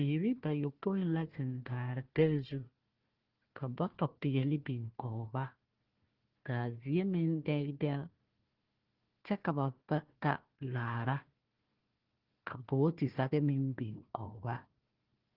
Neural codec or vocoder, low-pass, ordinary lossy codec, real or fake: codec, 16 kHz, 1 kbps, FunCodec, trained on Chinese and English, 50 frames a second; 5.4 kHz; Opus, 16 kbps; fake